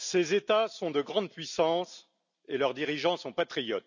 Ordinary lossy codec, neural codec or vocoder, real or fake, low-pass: none; none; real; 7.2 kHz